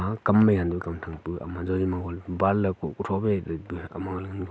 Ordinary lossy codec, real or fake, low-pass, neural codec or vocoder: none; real; none; none